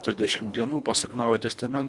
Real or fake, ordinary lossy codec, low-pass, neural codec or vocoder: fake; Opus, 64 kbps; 10.8 kHz; codec, 24 kHz, 1.5 kbps, HILCodec